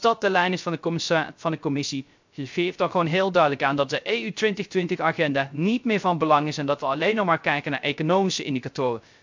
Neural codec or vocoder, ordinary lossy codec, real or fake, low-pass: codec, 16 kHz, 0.3 kbps, FocalCodec; MP3, 64 kbps; fake; 7.2 kHz